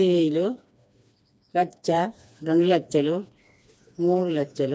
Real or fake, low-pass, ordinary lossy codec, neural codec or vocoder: fake; none; none; codec, 16 kHz, 2 kbps, FreqCodec, smaller model